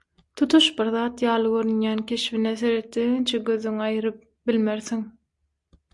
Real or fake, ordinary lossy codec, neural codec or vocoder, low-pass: real; MP3, 96 kbps; none; 10.8 kHz